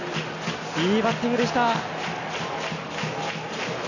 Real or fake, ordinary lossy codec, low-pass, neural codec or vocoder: real; none; 7.2 kHz; none